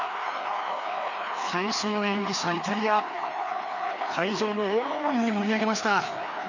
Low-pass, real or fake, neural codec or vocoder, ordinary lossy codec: 7.2 kHz; fake; codec, 16 kHz, 2 kbps, FreqCodec, larger model; none